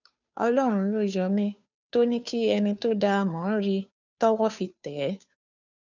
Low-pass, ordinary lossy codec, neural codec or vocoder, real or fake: 7.2 kHz; none; codec, 16 kHz, 2 kbps, FunCodec, trained on Chinese and English, 25 frames a second; fake